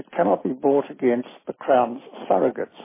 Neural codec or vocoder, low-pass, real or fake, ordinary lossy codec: none; 3.6 kHz; real; MP3, 16 kbps